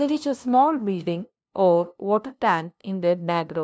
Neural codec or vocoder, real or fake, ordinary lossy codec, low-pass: codec, 16 kHz, 0.5 kbps, FunCodec, trained on LibriTTS, 25 frames a second; fake; none; none